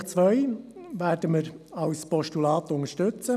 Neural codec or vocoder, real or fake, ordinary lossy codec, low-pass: none; real; none; 14.4 kHz